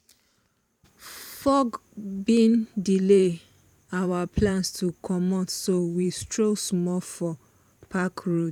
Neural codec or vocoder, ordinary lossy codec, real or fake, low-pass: vocoder, 44.1 kHz, 128 mel bands every 512 samples, BigVGAN v2; none; fake; 19.8 kHz